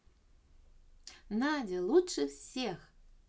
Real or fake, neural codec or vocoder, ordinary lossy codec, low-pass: real; none; none; none